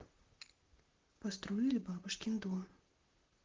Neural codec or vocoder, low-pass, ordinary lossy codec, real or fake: vocoder, 44.1 kHz, 128 mel bands, Pupu-Vocoder; 7.2 kHz; Opus, 16 kbps; fake